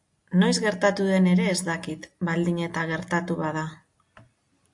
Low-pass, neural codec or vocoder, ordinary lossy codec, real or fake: 10.8 kHz; none; AAC, 64 kbps; real